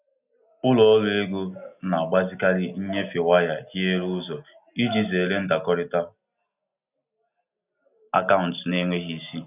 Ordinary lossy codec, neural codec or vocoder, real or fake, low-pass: none; none; real; 3.6 kHz